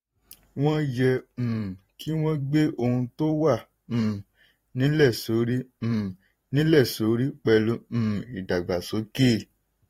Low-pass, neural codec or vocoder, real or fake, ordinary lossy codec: 19.8 kHz; vocoder, 44.1 kHz, 128 mel bands every 512 samples, BigVGAN v2; fake; AAC, 48 kbps